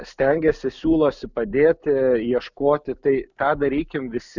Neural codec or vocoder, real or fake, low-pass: none; real; 7.2 kHz